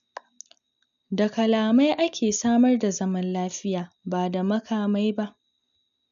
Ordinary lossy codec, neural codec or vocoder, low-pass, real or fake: none; none; 7.2 kHz; real